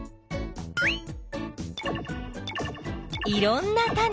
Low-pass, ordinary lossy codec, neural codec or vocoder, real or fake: none; none; none; real